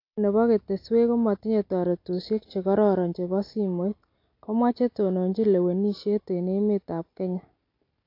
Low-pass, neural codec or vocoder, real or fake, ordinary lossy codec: 5.4 kHz; none; real; AAC, 32 kbps